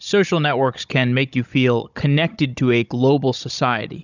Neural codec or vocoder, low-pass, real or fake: codec, 16 kHz, 16 kbps, FunCodec, trained on Chinese and English, 50 frames a second; 7.2 kHz; fake